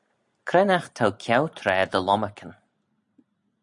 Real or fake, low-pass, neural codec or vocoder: real; 10.8 kHz; none